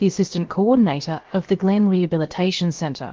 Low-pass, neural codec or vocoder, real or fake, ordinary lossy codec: 7.2 kHz; codec, 16 kHz, about 1 kbps, DyCAST, with the encoder's durations; fake; Opus, 16 kbps